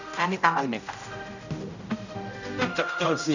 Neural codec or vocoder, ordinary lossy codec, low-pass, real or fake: codec, 16 kHz, 0.5 kbps, X-Codec, HuBERT features, trained on general audio; none; 7.2 kHz; fake